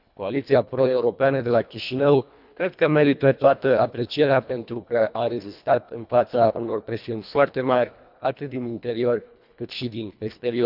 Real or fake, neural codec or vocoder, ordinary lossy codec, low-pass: fake; codec, 24 kHz, 1.5 kbps, HILCodec; none; 5.4 kHz